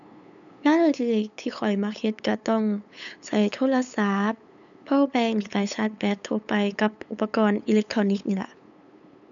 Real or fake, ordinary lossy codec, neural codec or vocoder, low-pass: fake; MP3, 96 kbps; codec, 16 kHz, 8 kbps, FunCodec, trained on LibriTTS, 25 frames a second; 7.2 kHz